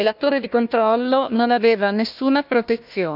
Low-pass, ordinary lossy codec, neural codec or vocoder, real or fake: 5.4 kHz; none; codec, 16 kHz, 1 kbps, FunCodec, trained on Chinese and English, 50 frames a second; fake